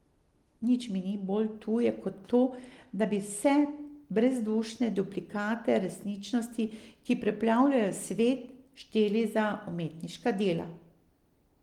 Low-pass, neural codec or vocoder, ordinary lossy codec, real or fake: 19.8 kHz; none; Opus, 24 kbps; real